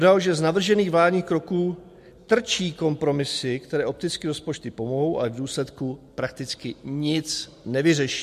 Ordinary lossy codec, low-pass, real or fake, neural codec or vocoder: MP3, 64 kbps; 14.4 kHz; real; none